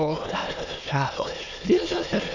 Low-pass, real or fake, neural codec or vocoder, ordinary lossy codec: 7.2 kHz; fake; autoencoder, 22.05 kHz, a latent of 192 numbers a frame, VITS, trained on many speakers; none